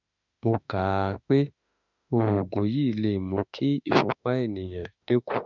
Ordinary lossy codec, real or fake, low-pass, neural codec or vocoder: none; fake; 7.2 kHz; autoencoder, 48 kHz, 32 numbers a frame, DAC-VAE, trained on Japanese speech